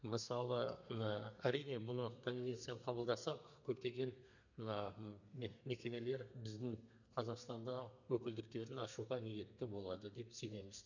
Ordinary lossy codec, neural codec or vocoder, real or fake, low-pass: none; codec, 32 kHz, 1.9 kbps, SNAC; fake; 7.2 kHz